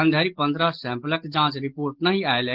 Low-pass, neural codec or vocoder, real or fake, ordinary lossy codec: 5.4 kHz; none; real; Opus, 16 kbps